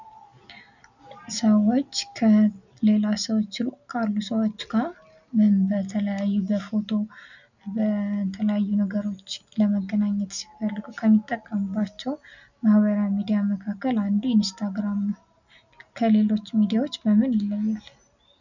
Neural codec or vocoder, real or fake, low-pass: none; real; 7.2 kHz